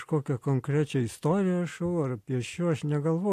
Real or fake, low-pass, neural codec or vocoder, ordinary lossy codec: real; 14.4 kHz; none; AAC, 64 kbps